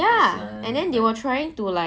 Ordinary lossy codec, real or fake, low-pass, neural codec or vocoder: none; real; none; none